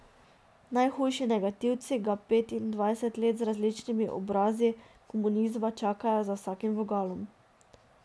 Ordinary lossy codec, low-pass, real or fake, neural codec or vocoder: none; none; real; none